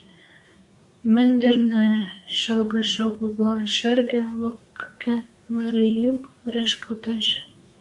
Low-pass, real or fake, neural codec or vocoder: 10.8 kHz; fake; codec, 24 kHz, 1 kbps, SNAC